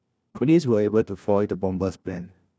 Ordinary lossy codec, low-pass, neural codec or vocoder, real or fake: none; none; codec, 16 kHz, 1 kbps, FunCodec, trained on LibriTTS, 50 frames a second; fake